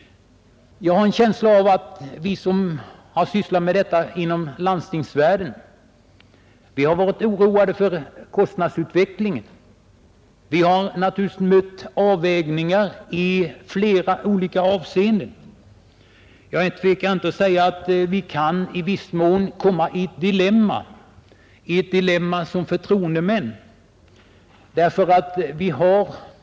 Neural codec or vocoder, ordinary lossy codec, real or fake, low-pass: none; none; real; none